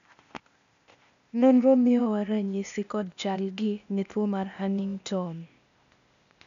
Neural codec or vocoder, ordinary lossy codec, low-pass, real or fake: codec, 16 kHz, 0.8 kbps, ZipCodec; none; 7.2 kHz; fake